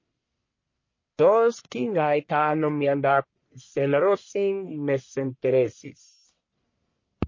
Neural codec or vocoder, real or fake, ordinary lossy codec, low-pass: codec, 44.1 kHz, 1.7 kbps, Pupu-Codec; fake; MP3, 32 kbps; 7.2 kHz